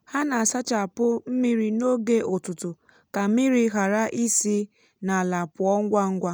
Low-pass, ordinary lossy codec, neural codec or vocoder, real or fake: none; none; none; real